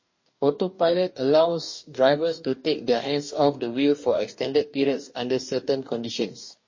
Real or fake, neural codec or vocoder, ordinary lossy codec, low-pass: fake; codec, 44.1 kHz, 2.6 kbps, DAC; MP3, 32 kbps; 7.2 kHz